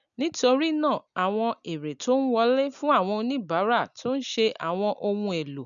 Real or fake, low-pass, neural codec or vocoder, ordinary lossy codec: real; 7.2 kHz; none; none